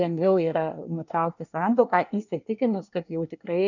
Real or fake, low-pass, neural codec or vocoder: fake; 7.2 kHz; codec, 24 kHz, 1 kbps, SNAC